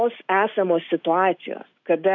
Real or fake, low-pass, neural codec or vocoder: real; 7.2 kHz; none